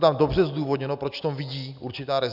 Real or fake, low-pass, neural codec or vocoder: real; 5.4 kHz; none